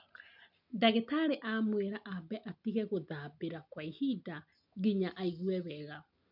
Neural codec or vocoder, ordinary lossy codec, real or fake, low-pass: none; none; real; 5.4 kHz